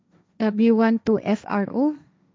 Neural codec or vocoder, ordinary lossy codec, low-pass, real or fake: codec, 16 kHz, 1.1 kbps, Voila-Tokenizer; none; none; fake